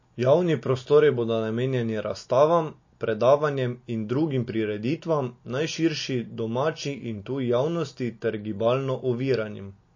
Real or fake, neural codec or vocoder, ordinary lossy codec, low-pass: real; none; MP3, 32 kbps; 7.2 kHz